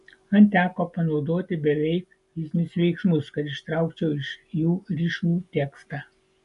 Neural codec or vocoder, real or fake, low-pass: none; real; 10.8 kHz